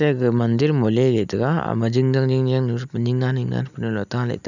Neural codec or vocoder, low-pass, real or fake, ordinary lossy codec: none; 7.2 kHz; real; none